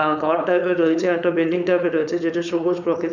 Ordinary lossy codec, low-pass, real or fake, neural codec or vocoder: none; 7.2 kHz; fake; codec, 16 kHz, 4.8 kbps, FACodec